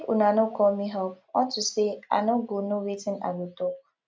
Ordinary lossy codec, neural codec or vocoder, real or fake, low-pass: none; none; real; none